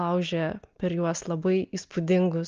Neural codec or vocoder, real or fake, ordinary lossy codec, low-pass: none; real; Opus, 24 kbps; 7.2 kHz